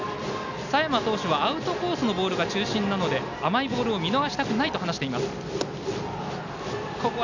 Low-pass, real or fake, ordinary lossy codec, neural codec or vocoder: 7.2 kHz; real; none; none